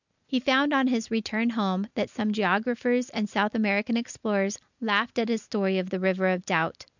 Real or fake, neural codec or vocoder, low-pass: real; none; 7.2 kHz